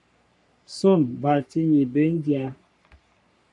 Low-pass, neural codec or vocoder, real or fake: 10.8 kHz; codec, 44.1 kHz, 3.4 kbps, Pupu-Codec; fake